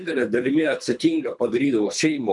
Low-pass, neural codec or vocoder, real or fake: 10.8 kHz; codec, 24 kHz, 3 kbps, HILCodec; fake